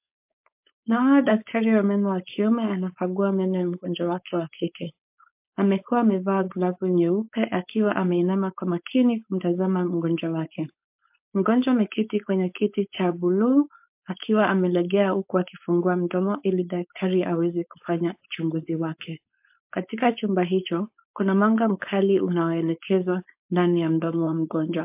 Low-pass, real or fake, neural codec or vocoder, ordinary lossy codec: 3.6 kHz; fake; codec, 16 kHz, 4.8 kbps, FACodec; MP3, 32 kbps